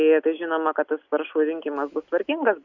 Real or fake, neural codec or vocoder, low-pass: real; none; 7.2 kHz